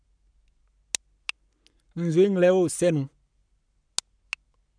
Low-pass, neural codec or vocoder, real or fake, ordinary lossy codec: 9.9 kHz; codec, 44.1 kHz, 7.8 kbps, Pupu-Codec; fake; none